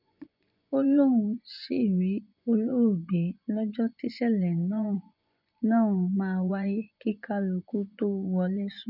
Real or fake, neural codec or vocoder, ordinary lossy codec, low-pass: fake; vocoder, 24 kHz, 100 mel bands, Vocos; none; 5.4 kHz